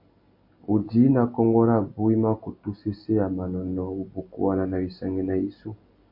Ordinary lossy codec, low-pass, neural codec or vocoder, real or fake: MP3, 32 kbps; 5.4 kHz; none; real